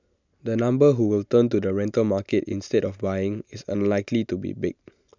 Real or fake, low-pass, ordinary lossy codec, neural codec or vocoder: real; 7.2 kHz; none; none